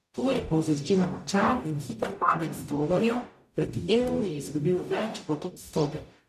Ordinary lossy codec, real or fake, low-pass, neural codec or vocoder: none; fake; 14.4 kHz; codec, 44.1 kHz, 0.9 kbps, DAC